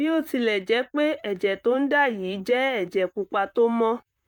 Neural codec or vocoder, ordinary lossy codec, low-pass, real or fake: vocoder, 44.1 kHz, 128 mel bands, Pupu-Vocoder; none; 19.8 kHz; fake